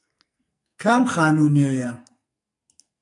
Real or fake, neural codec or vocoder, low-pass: fake; codec, 44.1 kHz, 2.6 kbps, SNAC; 10.8 kHz